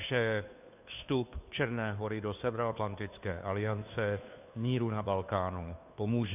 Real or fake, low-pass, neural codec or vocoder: fake; 3.6 kHz; codec, 16 kHz, 2 kbps, FunCodec, trained on Chinese and English, 25 frames a second